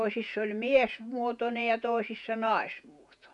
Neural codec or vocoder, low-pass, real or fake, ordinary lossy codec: vocoder, 48 kHz, 128 mel bands, Vocos; 10.8 kHz; fake; none